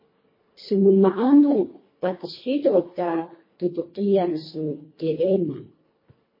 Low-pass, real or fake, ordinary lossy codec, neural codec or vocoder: 5.4 kHz; fake; MP3, 24 kbps; codec, 24 kHz, 1.5 kbps, HILCodec